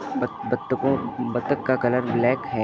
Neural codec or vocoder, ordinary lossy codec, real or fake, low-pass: none; none; real; none